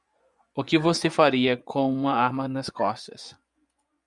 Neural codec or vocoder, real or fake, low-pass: vocoder, 24 kHz, 100 mel bands, Vocos; fake; 10.8 kHz